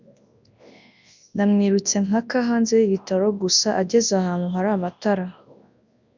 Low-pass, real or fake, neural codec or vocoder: 7.2 kHz; fake; codec, 24 kHz, 0.9 kbps, WavTokenizer, large speech release